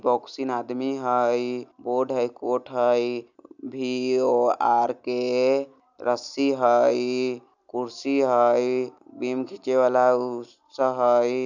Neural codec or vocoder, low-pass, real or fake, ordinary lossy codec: none; 7.2 kHz; real; none